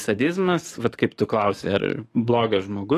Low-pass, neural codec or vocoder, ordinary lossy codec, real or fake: 14.4 kHz; codec, 44.1 kHz, 7.8 kbps, DAC; AAC, 48 kbps; fake